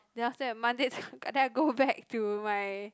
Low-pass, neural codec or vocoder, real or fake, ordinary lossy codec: none; none; real; none